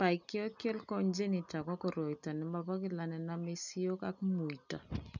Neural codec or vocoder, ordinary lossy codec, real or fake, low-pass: none; none; real; 7.2 kHz